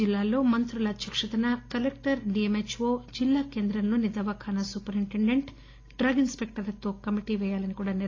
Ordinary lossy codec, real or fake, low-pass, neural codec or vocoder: AAC, 32 kbps; real; 7.2 kHz; none